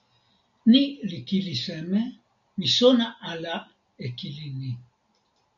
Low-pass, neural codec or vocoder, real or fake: 7.2 kHz; none; real